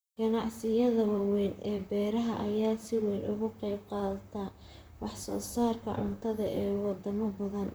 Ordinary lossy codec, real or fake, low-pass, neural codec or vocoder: none; fake; none; vocoder, 44.1 kHz, 128 mel bands, Pupu-Vocoder